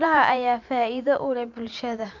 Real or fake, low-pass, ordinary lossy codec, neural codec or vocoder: fake; 7.2 kHz; none; vocoder, 44.1 kHz, 128 mel bands every 512 samples, BigVGAN v2